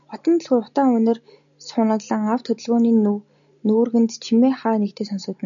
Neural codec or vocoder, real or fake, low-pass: none; real; 7.2 kHz